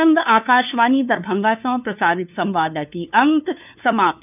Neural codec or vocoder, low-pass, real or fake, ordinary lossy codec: codec, 16 kHz, 2 kbps, FunCodec, trained on LibriTTS, 25 frames a second; 3.6 kHz; fake; none